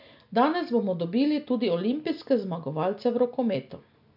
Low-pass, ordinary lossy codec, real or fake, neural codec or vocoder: 5.4 kHz; none; real; none